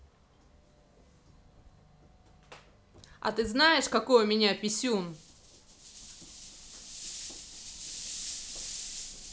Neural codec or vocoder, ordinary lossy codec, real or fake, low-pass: none; none; real; none